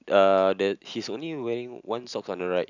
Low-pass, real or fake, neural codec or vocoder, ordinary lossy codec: 7.2 kHz; real; none; none